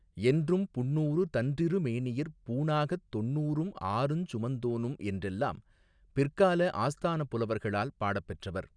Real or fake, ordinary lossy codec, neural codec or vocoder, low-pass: real; none; none; 9.9 kHz